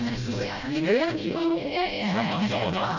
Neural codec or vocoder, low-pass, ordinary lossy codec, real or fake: codec, 16 kHz, 0.5 kbps, FreqCodec, smaller model; 7.2 kHz; none; fake